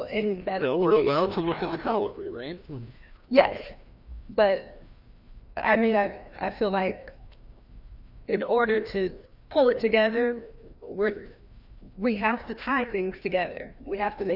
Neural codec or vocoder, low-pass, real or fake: codec, 16 kHz, 1 kbps, FreqCodec, larger model; 5.4 kHz; fake